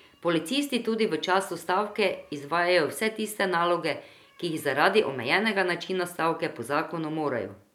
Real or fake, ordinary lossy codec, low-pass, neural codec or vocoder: real; none; 19.8 kHz; none